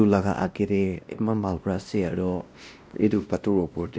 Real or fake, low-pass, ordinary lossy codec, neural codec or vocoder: fake; none; none; codec, 16 kHz, 1 kbps, X-Codec, WavLM features, trained on Multilingual LibriSpeech